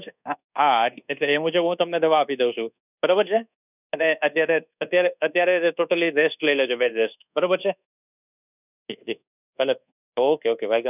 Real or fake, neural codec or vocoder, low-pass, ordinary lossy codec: fake; codec, 24 kHz, 1.2 kbps, DualCodec; 3.6 kHz; none